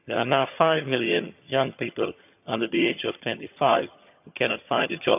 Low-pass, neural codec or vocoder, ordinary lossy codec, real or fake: 3.6 kHz; vocoder, 22.05 kHz, 80 mel bands, HiFi-GAN; none; fake